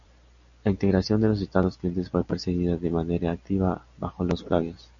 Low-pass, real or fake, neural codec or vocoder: 7.2 kHz; real; none